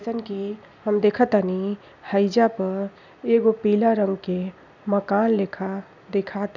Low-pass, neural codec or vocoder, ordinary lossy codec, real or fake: 7.2 kHz; none; none; real